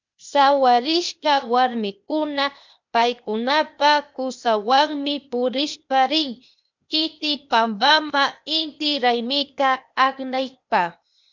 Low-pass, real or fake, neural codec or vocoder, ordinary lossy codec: 7.2 kHz; fake; codec, 16 kHz, 0.8 kbps, ZipCodec; MP3, 64 kbps